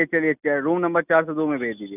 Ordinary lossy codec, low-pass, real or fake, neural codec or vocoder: none; 3.6 kHz; real; none